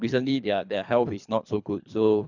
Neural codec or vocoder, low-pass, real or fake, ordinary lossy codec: codec, 24 kHz, 3 kbps, HILCodec; 7.2 kHz; fake; none